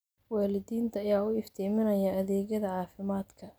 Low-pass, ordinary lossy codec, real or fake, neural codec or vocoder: none; none; real; none